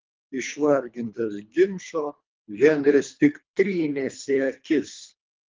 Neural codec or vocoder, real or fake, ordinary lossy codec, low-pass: codec, 24 kHz, 3 kbps, HILCodec; fake; Opus, 24 kbps; 7.2 kHz